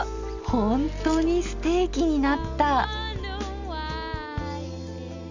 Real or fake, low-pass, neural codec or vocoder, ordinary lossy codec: real; 7.2 kHz; none; none